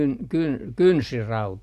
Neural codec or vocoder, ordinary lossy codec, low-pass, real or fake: none; none; 14.4 kHz; real